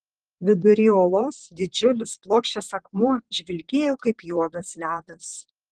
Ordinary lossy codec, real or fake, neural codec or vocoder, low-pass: Opus, 16 kbps; fake; vocoder, 44.1 kHz, 128 mel bands, Pupu-Vocoder; 10.8 kHz